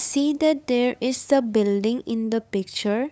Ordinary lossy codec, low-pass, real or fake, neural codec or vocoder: none; none; fake; codec, 16 kHz, 8 kbps, FunCodec, trained on LibriTTS, 25 frames a second